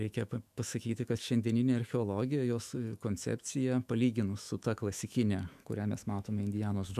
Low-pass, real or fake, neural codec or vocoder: 14.4 kHz; fake; autoencoder, 48 kHz, 128 numbers a frame, DAC-VAE, trained on Japanese speech